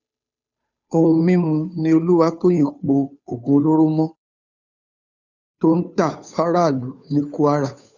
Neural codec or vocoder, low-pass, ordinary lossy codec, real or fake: codec, 16 kHz, 2 kbps, FunCodec, trained on Chinese and English, 25 frames a second; 7.2 kHz; none; fake